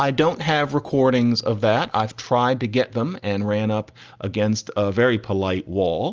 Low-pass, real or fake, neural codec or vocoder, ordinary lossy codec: 7.2 kHz; real; none; Opus, 24 kbps